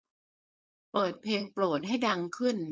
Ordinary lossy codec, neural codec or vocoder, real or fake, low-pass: none; codec, 16 kHz, 4.8 kbps, FACodec; fake; none